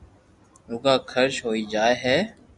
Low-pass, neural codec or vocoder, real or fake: 10.8 kHz; none; real